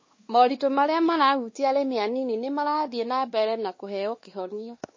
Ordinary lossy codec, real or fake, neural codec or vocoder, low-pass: MP3, 32 kbps; fake; codec, 16 kHz, 2 kbps, X-Codec, WavLM features, trained on Multilingual LibriSpeech; 7.2 kHz